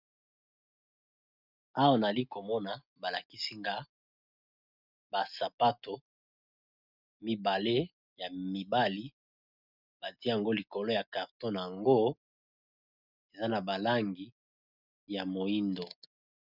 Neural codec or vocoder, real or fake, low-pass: none; real; 5.4 kHz